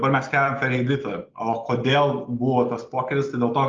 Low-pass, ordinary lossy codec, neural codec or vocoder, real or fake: 7.2 kHz; Opus, 24 kbps; none; real